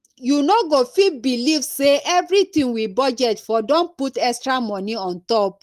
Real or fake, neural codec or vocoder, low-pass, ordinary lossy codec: real; none; 14.4 kHz; Opus, 24 kbps